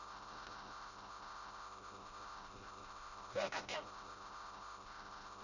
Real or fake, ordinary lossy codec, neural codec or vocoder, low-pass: fake; none; codec, 16 kHz, 0.5 kbps, FreqCodec, smaller model; 7.2 kHz